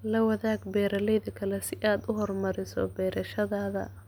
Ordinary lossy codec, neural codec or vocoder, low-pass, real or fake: none; none; none; real